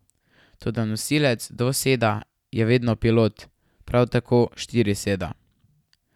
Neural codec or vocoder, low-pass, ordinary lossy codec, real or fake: none; 19.8 kHz; none; real